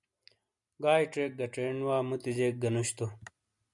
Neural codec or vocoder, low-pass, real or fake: none; 10.8 kHz; real